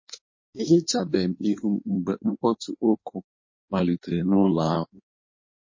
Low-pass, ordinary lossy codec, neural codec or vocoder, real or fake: 7.2 kHz; MP3, 32 kbps; codec, 16 kHz in and 24 kHz out, 1.1 kbps, FireRedTTS-2 codec; fake